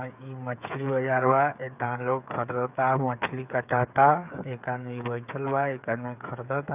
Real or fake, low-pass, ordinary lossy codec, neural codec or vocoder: fake; 3.6 kHz; none; codec, 16 kHz, 8 kbps, FreqCodec, smaller model